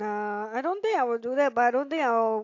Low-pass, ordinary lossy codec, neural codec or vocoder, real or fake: 7.2 kHz; AAC, 48 kbps; none; real